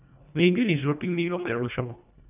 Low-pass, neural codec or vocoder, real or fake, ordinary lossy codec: 3.6 kHz; codec, 24 kHz, 1.5 kbps, HILCodec; fake; none